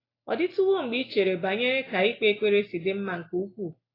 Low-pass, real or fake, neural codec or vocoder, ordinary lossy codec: 5.4 kHz; real; none; AAC, 24 kbps